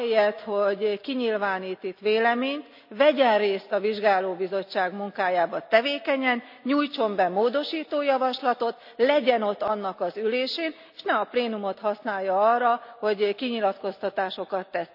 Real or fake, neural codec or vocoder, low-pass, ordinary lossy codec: real; none; 5.4 kHz; none